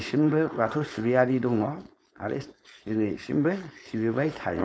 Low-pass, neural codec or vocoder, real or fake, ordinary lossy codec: none; codec, 16 kHz, 4.8 kbps, FACodec; fake; none